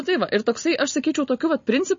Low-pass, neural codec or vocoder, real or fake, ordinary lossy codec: 7.2 kHz; none; real; MP3, 32 kbps